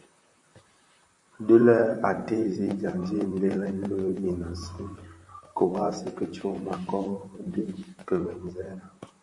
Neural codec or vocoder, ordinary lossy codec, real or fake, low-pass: vocoder, 44.1 kHz, 128 mel bands, Pupu-Vocoder; MP3, 48 kbps; fake; 10.8 kHz